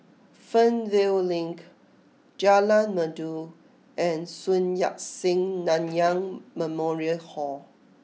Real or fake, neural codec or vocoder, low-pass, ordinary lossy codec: real; none; none; none